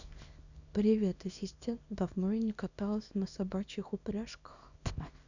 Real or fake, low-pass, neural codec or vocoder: fake; 7.2 kHz; codec, 24 kHz, 0.9 kbps, WavTokenizer, small release